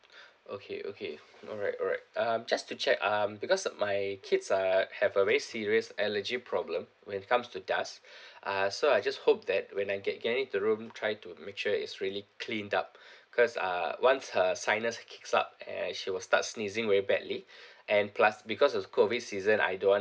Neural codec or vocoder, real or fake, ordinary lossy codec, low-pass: none; real; none; none